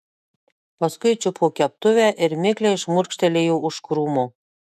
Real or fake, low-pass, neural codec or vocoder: fake; 14.4 kHz; autoencoder, 48 kHz, 128 numbers a frame, DAC-VAE, trained on Japanese speech